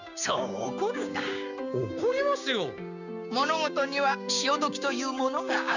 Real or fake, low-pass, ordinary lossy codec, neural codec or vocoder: fake; 7.2 kHz; none; codec, 16 kHz, 6 kbps, DAC